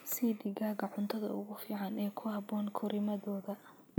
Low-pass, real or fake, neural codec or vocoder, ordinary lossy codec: none; real; none; none